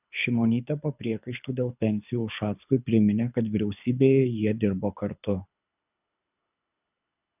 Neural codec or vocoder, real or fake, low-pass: codec, 24 kHz, 6 kbps, HILCodec; fake; 3.6 kHz